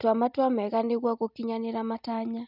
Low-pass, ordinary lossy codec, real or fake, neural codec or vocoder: 5.4 kHz; none; real; none